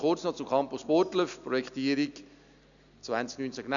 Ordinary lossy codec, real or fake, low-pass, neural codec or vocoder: none; real; 7.2 kHz; none